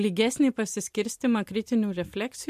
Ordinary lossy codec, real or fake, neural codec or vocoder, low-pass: MP3, 64 kbps; real; none; 14.4 kHz